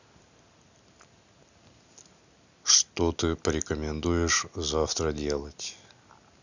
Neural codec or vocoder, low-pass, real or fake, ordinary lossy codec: none; 7.2 kHz; real; none